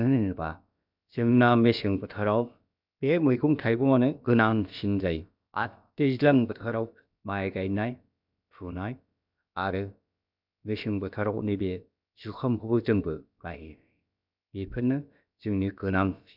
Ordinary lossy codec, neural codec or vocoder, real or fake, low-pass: none; codec, 16 kHz, about 1 kbps, DyCAST, with the encoder's durations; fake; 5.4 kHz